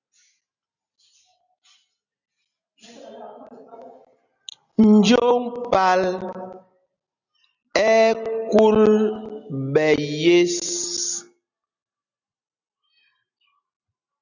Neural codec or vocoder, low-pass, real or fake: none; 7.2 kHz; real